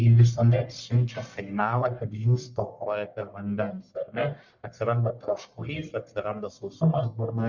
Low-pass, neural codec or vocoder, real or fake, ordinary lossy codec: 7.2 kHz; codec, 44.1 kHz, 1.7 kbps, Pupu-Codec; fake; Opus, 64 kbps